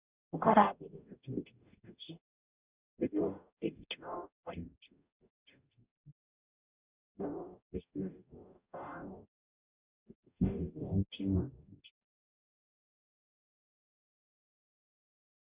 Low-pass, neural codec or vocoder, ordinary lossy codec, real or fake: 3.6 kHz; codec, 44.1 kHz, 0.9 kbps, DAC; Opus, 64 kbps; fake